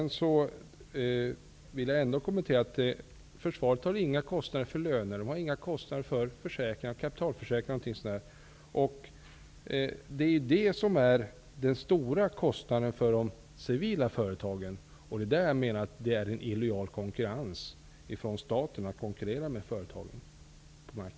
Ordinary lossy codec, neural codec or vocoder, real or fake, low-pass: none; none; real; none